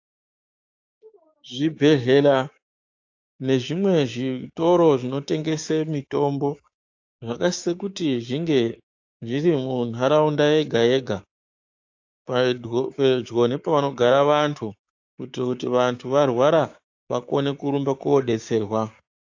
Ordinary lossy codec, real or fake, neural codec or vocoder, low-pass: AAC, 48 kbps; fake; codec, 16 kHz, 6 kbps, DAC; 7.2 kHz